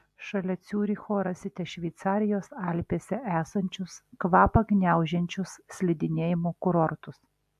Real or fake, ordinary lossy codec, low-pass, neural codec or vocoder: real; AAC, 96 kbps; 14.4 kHz; none